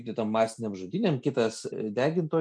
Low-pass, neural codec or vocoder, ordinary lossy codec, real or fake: 9.9 kHz; none; MP3, 64 kbps; real